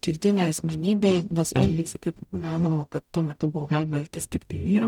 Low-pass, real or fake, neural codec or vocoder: 19.8 kHz; fake; codec, 44.1 kHz, 0.9 kbps, DAC